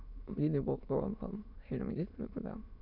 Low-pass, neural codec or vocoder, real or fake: 5.4 kHz; autoencoder, 22.05 kHz, a latent of 192 numbers a frame, VITS, trained on many speakers; fake